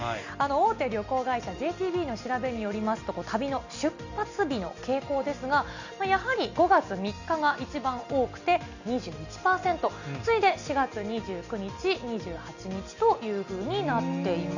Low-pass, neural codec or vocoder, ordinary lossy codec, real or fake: 7.2 kHz; none; none; real